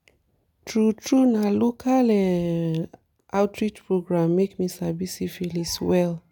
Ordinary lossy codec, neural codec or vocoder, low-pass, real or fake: none; none; none; real